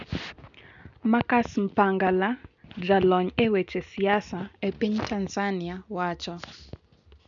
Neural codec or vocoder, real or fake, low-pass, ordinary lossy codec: none; real; 7.2 kHz; none